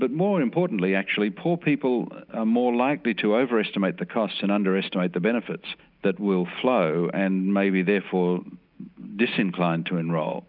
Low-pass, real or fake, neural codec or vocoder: 5.4 kHz; real; none